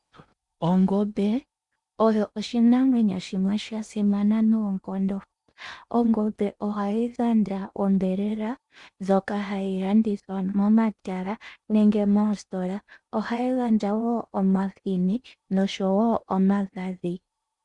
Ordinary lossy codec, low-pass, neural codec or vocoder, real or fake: Opus, 64 kbps; 10.8 kHz; codec, 16 kHz in and 24 kHz out, 0.8 kbps, FocalCodec, streaming, 65536 codes; fake